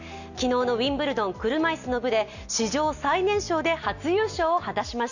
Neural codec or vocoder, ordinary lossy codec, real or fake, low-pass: none; none; real; 7.2 kHz